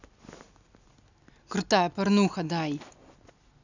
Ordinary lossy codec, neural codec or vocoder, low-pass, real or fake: none; none; 7.2 kHz; real